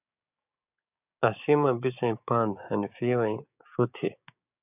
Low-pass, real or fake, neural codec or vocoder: 3.6 kHz; fake; codec, 16 kHz, 6 kbps, DAC